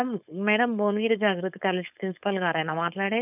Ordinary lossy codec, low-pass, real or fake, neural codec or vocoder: none; 3.6 kHz; fake; codec, 16 kHz, 4.8 kbps, FACodec